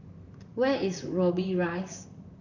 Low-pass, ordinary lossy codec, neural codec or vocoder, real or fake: 7.2 kHz; none; vocoder, 44.1 kHz, 128 mel bands, Pupu-Vocoder; fake